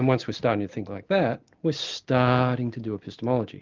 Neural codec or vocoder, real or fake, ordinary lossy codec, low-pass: none; real; Opus, 32 kbps; 7.2 kHz